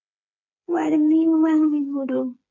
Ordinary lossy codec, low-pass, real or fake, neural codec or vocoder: MP3, 48 kbps; 7.2 kHz; fake; codec, 16 kHz, 4 kbps, FreqCodec, smaller model